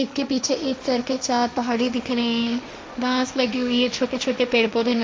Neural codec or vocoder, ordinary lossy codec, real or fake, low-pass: codec, 16 kHz, 1.1 kbps, Voila-Tokenizer; none; fake; none